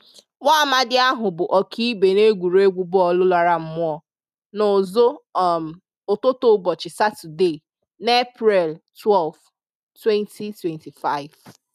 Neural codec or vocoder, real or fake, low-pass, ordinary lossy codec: none; real; 14.4 kHz; none